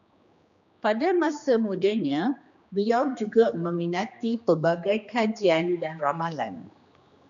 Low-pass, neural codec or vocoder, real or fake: 7.2 kHz; codec, 16 kHz, 2 kbps, X-Codec, HuBERT features, trained on general audio; fake